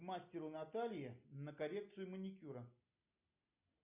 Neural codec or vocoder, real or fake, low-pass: none; real; 3.6 kHz